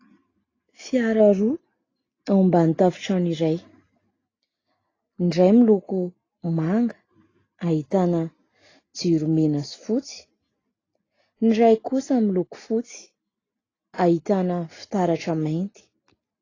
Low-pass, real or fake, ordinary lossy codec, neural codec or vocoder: 7.2 kHz; real; AAC, 32 kbps; none